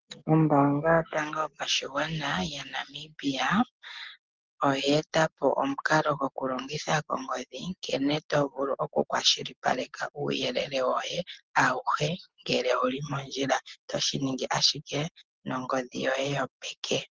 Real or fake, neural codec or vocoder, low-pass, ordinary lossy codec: real; none; 7.2 kHz; Opus, 16 kbps